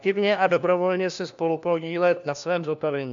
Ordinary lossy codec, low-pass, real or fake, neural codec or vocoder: AAC, 64 kbps; 7.2 kHz; fake; codec, 16 kHz, 1 kbps, FunCodec, trained on LibriTTS, 50 frames a second